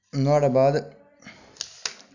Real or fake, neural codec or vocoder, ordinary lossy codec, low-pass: real; none; none; 7.2 kHz